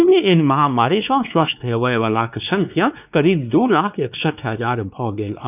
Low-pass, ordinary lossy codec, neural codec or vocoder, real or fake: 3.6 kHz; none; codec, 16 kHz, 2 kbps, X-Codec, WavLM features, trained on Multilingual LibriSpeech; fake